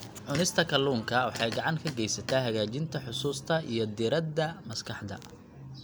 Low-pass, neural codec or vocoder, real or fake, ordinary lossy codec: none; none; real; none